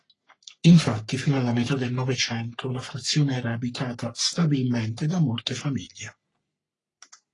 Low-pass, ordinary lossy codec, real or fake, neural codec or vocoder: 10.8 kHz; AAC, 32 kbps; fake; codec, 44.1 kHz, 3.4 kbps, Pupu-Codec